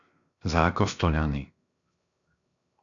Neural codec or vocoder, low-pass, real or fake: codec, 16 kHz, 0.7 kbps, FocalCodec; 7.2 kHz; fake